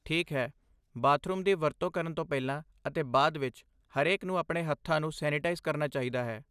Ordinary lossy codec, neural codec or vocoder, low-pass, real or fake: none; none; 14.4 kHz; real